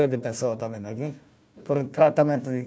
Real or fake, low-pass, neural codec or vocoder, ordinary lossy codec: fake; none; codec, 16 kHz, 1 kbps, FunCodec, trained on Chinese and English, 50 frames a second; none